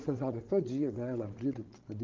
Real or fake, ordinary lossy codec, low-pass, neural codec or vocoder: fake; Opus, 16 kbps; 7.2 kHz; codec, 16 kHz, 8 kbps, FreqCodec, larger model